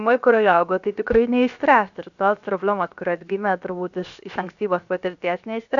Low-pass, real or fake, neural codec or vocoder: 7.2 kHz; fake; codec, 16 kHz, 0.7 kbps, FocalCodec